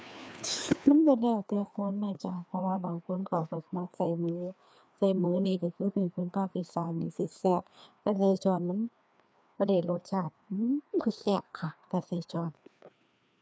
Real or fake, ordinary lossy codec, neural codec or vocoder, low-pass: fake; none; codec, 16 kHz, 2 kbps, FreqCodec, larger model; none